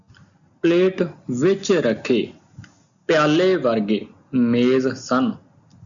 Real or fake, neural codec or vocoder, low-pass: real; none; 7.2 kHz